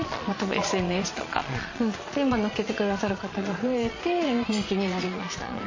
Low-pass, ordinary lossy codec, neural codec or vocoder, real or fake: 7.2 kHz; MP3, 32 kbps; vocoder, 22.05 kHz, 80 mel bands, Vocos; fake